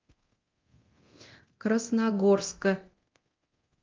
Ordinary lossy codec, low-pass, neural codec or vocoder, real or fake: Opus, 32 kbps; 7.2 kHz; codec, 24 kHz, 0.9 kbps, DualCodec; fake